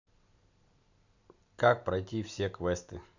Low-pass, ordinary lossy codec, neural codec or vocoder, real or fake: 7.2 kHz; none; none; real